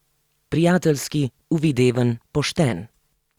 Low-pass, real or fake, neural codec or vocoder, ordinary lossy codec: 19.8 kHz; real; none; Opus, 64 kbps